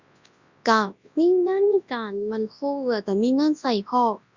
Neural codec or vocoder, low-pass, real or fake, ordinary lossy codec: codec, 24 kHz, 0.9 kbps, WavTokenizer, large speech release; 7.2 kHz; fake; Opus, 64 kbps